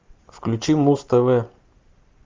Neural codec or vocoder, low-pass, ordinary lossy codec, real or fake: none; 7.2 kHz; Opus, 32 kbps; real